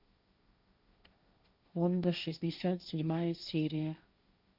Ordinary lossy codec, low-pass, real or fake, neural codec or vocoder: Opus, 64 kbps; 5.4 kHz; fake; codec, 16 kHz, 1.1 kbps, Voila-Tokenizer